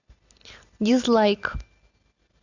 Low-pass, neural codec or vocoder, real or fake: 7.2 kHz; none; real